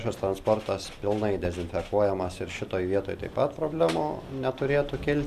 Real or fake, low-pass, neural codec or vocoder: real; 14.4 kHz; none